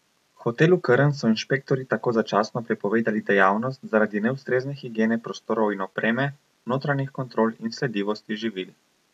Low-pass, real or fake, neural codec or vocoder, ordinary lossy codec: 14.4 kHz; real; none; none